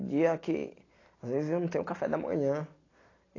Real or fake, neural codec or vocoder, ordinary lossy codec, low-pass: real; none; AAC, 32 kbps; 7.2 kHz